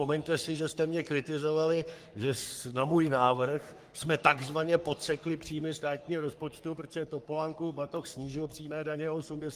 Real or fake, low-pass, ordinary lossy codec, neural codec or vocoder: fake; 14.4 kHz; Opus, 32 kbps; codec, 44.1 kHz, 3.4 kbps, Pupu-Codec